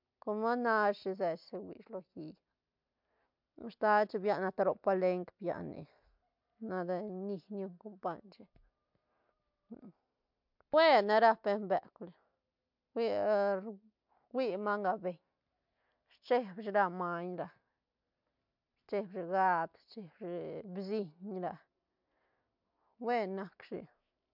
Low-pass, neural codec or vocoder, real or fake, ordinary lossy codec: 5.4 kHz; none; real; MP3, 48 kbps